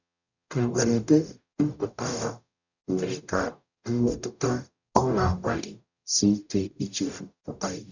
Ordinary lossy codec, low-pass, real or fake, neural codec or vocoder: none; 7.2 kHz; fake; codec, 44.1 kHz, 0.9 kbps, DAC